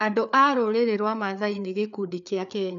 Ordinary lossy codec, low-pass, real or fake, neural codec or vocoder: none; 7.2 kHz; fake; codec, 16 kHz, 4 kbps, FreqCodec, larger model